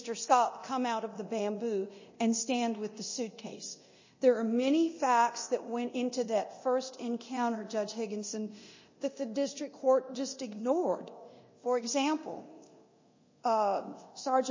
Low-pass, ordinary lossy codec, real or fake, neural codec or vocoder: 7.2 kHz; MP3, 32 kbps; fake; codec, 24 kHz, 0.9 kbps, DualCodec